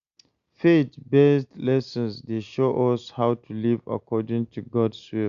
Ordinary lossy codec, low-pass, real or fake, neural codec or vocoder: Opus, 64 kbps; 7.2 kHz; real; none